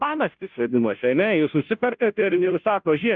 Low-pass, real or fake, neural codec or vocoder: 7.2 kHz; fake; codec, 16 kHz, 0.5 kbps, FunCodec, trained on Chinese and English, 25 frames a second